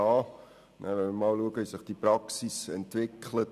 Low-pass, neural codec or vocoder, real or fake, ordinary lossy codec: 14.4 kHz; none; real; none